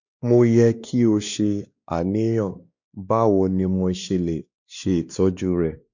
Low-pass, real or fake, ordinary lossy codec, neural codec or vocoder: 7.2 kHz; fake; none; codec, 16 kHz, 2 kbps, X-Codec, WavLM features, trained on Multilingual LibriSpeech